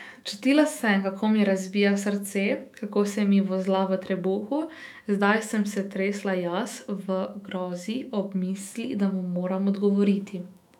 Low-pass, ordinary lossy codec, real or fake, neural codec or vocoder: 19.8 kHz; none; fake; autoencoder, 48 kHz, 128 numbers a frame, DAC-VAE, trained on Japanese speech